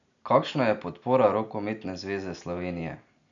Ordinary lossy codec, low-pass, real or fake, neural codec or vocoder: none; 7.2 kHz; real; none